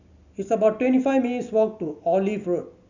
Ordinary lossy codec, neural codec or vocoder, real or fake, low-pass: none; none; real; 7.2 kHz